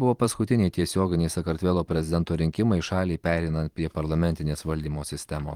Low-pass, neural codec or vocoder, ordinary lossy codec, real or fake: 19.8 kHz; vocoder, 44.1 kHz, 128 mel bands every 512 samples, BigVGAN v2; Opus, 24 kbps; fake